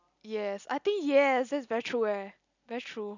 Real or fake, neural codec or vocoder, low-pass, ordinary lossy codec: real; none; 7.2 kHz; none